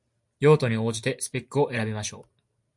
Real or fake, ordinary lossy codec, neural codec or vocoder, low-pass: real; MP3, 64 kbps; none; 10.8 kHz